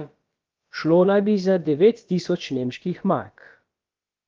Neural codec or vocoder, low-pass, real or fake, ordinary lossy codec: codec, 16 kHz, about 1 kbps, DyCAST, with the encoder's durations; 7.2 kHz; fake; Opus, 32 kbps